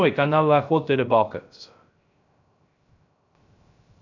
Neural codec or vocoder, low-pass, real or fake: codec, 16 kHz, 0.3 kbps, FocalCodec; 7.2 kHz; fake